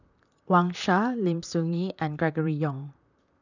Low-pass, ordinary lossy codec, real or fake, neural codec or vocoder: 7.2 kHz; none; fake; vocoder, 44.1 kHz, 128 mel bands, Pupu-Vocoder